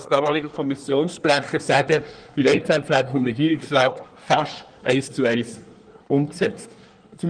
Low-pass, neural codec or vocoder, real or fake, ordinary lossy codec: 9.9 kHz; codec, 24 kHz, 1 kbps, SNAC; fake; Opus, 24 kbps